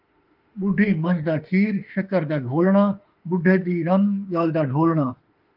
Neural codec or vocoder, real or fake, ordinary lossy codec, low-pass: autoencoder, 48 kHz, 32 numbers a frame, DAC-VAE, trained on Japanese speech; fake; Opus, 24 kbps; 5.4 kHz